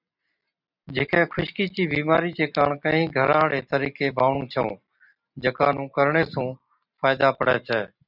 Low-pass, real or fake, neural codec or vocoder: 5.4 kHz; real; none